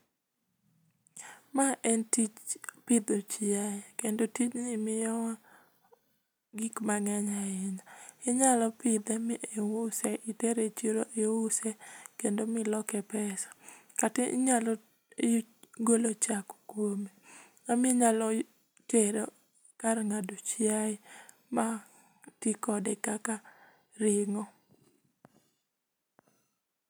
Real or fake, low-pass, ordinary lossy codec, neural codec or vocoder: real; none; none; none